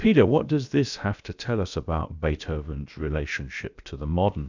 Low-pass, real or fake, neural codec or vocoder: 7.2 kHz; fake; codec, 16 kHz, about 1 kbps, DyCAST, with the encoder's durations